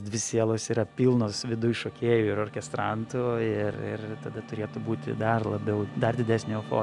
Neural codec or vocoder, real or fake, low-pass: none; real; 10.8 kHz